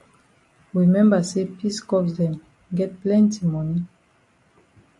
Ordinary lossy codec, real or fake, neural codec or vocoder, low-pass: MP3, 48 kbps; real; none; 10.8 kHz